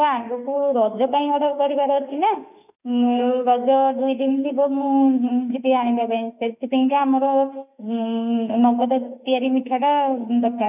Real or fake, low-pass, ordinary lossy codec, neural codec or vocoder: fake; 3.6 kHz; none; autoencoder, 48 kHz, 32 numbers a frame, DAC-VAE, trained on Japanese speech